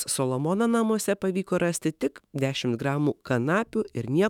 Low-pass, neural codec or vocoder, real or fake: 19.8 kHz; autoencoder, 48 kHz, 128 numbers a frame, DAC-VAE, trained on Japanese speech; fake